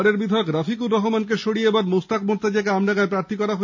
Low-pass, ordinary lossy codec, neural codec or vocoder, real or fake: 7.2 kHz; none; none; real